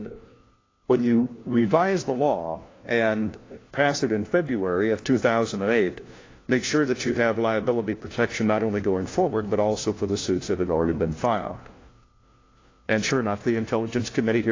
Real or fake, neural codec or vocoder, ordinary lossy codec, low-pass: fake; codec, 16 kHz, 1 kbps, FunCodec, trained on LibriTTS, 50 frames a second; AAC, 32 kbps; 7.2 kHz